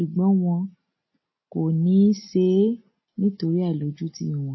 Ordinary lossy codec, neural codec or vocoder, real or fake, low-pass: MP3, 24 kbps; none; real; 7.2 kHz